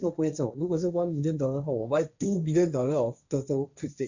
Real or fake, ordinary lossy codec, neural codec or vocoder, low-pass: fake; none; codec, 16 kHz, 1.1 kbps, Voila-Tokenizer; 7.2 kHz